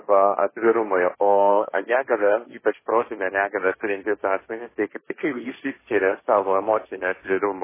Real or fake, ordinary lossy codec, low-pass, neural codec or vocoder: fake; MP3, 16 kbps; 3.6 kHz; codec, 16 kHz, 1.1 kbps, Voila-Tokenizer